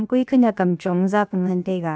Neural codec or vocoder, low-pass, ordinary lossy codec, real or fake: codec, 16 kHz, 0.7 kbps, FocalCodec; none; none; fake